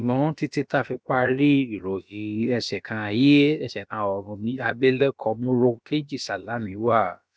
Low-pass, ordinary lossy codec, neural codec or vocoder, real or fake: none; none; codec, 16 kHz, about 1 kbps, DyCAST, with the encoder's durations; fake